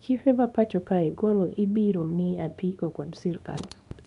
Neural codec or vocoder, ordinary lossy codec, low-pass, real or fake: codec, 24 kHz, 0.9 kbps, WavTokenizer, small release; none; 10.8 kHz; fake